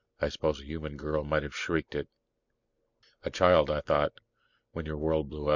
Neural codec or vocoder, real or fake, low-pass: none; real; 7.2 kHz